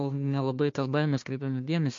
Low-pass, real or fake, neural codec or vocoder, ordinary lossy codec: 7.2 kHz; fake; codec, 16 kHz, 1 kbps, FunCodec, trained on Chinese and English, 50 frames a second; MP3, 48 kbps